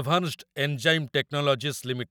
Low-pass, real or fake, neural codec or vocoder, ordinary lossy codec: none; real; none; none